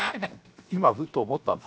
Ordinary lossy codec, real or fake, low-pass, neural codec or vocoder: none; fake; none; codec, 16 kHz, 0.7 kbps, FocalCodec